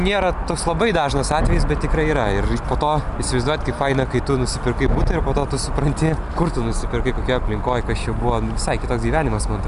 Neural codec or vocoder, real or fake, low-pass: none; real; 10.8 kHz